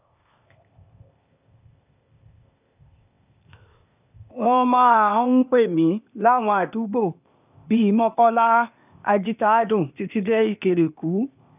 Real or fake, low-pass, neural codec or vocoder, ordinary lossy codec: fake; 3.6 kHz; codec, 16 kHz, 0.8 kbps, ZipCodec; none